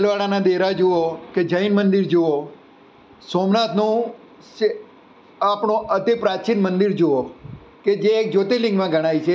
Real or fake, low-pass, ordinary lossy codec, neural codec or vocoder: real; none; none; none